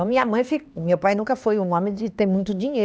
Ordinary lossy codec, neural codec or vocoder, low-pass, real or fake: none; codec, 16 kHz, 2 kbps, X-Codec, HuBERT features, trained on LibriSpeech; none; fake